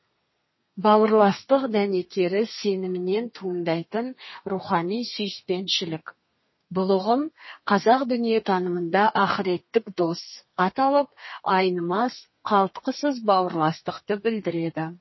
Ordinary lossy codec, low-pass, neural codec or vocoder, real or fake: MP3, 24 kbps; 7.2 kHz; codec, 32 kHz, 1.9 kbps, SNAC; fake